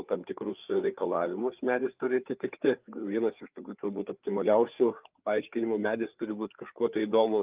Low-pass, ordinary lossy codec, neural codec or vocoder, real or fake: 3.6 kHz; Opus, 32 kbps; codec, 16 kHz, 4 kbps, FreqCodec, larger model; fake